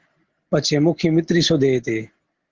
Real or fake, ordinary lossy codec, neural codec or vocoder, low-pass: real; Opus, 16 kbps; none; 7.2 kHz